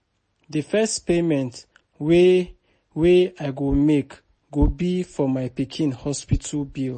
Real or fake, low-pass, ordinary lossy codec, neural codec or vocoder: real; 9.9 kHz; MP3, 32 kbps; none